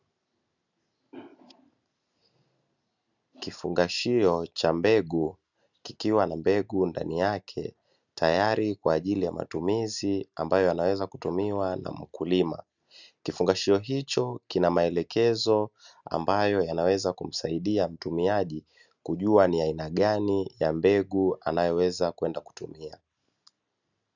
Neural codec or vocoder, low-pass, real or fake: none; 7.2 kHz; real